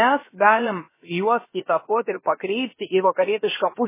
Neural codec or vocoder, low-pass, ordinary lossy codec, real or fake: codec, 16 kHz, about 1 kbps, DyCAST, with the encoder's durations; 3.6 kHz; MP3, 16 kbps; fake